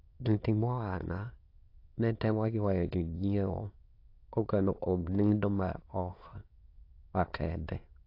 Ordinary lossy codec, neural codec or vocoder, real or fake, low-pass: AAC, 32 kbps; autoencoder, 22.05 kHz, a latent of 192 numbers a frame, VITS, trained on many speakers; fake; 5.4 kHz